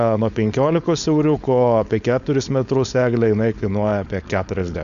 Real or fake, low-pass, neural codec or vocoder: fake; 7.2 kHz; codec, 16 kHz, 4.8 kbps, FACodec